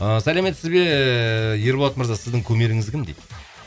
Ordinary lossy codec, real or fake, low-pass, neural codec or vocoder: none; real; none; none